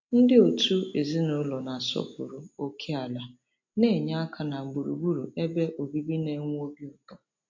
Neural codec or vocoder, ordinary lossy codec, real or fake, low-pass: none; MP3, 48 kbps; real; 7.2 kHz